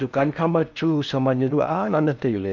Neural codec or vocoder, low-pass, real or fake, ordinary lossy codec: codec, 16 kHz in and 24 kHz out, 0.6 kbps, FocalCodec, streaming, 4096 codes; 7.2 kHz; fake; none